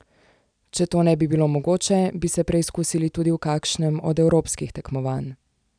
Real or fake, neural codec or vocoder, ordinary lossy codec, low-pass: real; none; none; 9.9 kHz